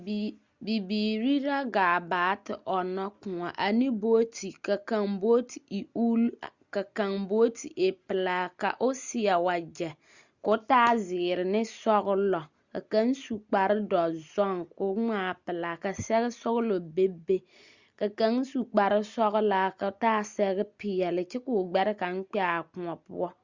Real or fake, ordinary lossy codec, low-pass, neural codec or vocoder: real; Opus, 64 kbps; 7.2 kHz; none